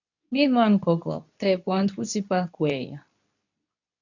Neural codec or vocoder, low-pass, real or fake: codec, 24 kHz, 0.9 kbps, WavTokenizer, medium speech release version 2; 7.2 kHz; fake